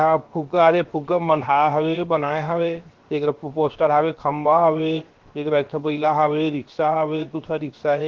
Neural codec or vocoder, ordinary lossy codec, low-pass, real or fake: codec, 16 kHz, 0.7 kbps, FocalCodec; Opus, 16 kbps; 7.2 kHz; fake